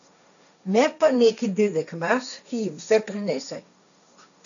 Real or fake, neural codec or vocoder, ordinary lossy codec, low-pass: fake; codec, 16 kHz, 1.1 kbps, Voila-Tokenizer; none; 7.2 kHz